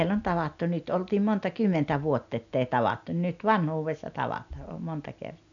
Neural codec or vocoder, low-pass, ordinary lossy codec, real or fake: none; 7.2 kHz; none; real